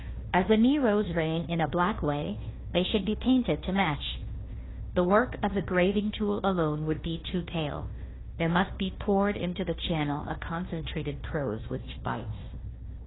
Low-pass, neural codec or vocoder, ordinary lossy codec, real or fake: 7.2 kHz; codec, 16 kHz, 1 kbps, FunCodec, trained on Chinese and English, 50 frames a second; AAC, 16 kbps; fake